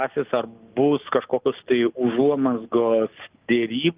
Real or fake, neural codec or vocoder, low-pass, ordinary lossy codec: real; none; 3.6 kHz; Opus, 32 kbps